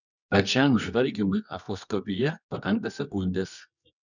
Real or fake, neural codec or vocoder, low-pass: fake; codec, 24 kHz, 0.9 kbps, WavTokenizer, medium music audio release; 7.2 kHz